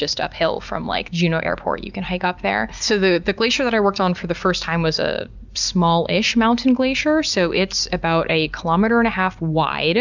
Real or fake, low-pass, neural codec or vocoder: real; 7.2 kHz; none